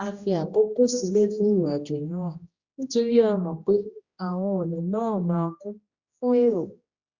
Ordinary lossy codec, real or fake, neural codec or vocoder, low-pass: Opus, 64 kbps; fake; codec, 16 kHz, 1 kbps, X-Codec, HuBERT features, trained on general audio; 7.2 kHz